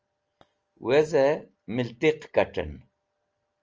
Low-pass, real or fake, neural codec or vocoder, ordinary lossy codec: 7.2 kHz; real; none; Opus, 24 kbps